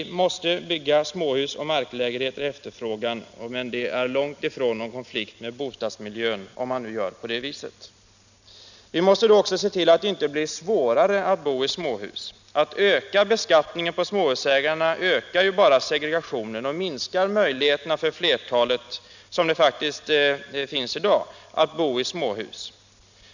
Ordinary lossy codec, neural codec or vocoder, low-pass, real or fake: none; none; 7.2 kHz; real